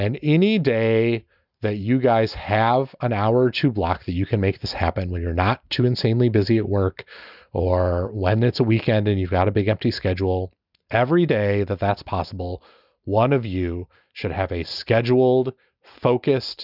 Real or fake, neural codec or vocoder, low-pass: real; none; 5.4 kHz